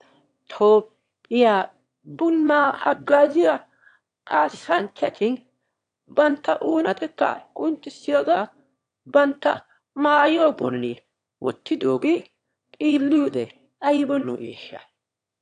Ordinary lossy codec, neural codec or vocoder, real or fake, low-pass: AAC, 64 kbps; autoencoder, 22.05 kHz, a latent of 192 numbers a frame, VITS, trained on one speaker; fake; 9.9 kHz